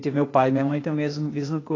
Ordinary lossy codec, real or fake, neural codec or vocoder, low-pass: AAC, 32 kbps; fake; codec, 16 kHz, 0.8 kbps, ZipCodec; 7.2 kHz